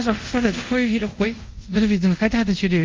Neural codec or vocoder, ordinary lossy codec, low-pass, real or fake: codec, 24 kHz, 0.5 kbps, DualCodec; Opus, 24 kbps; 7.2 kHz; fake